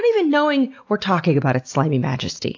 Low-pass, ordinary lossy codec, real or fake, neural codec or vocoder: 7.2 kHz; AAC, 48 kbps; real; none